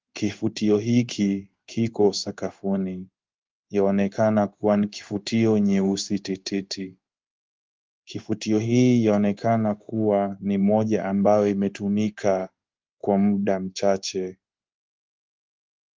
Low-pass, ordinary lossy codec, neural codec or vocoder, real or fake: 7.2 kHz; Opus, 32 kbps; codec, 16 kHz in and 24 kHz out, 1 kbps, XY-Tokenizer; fake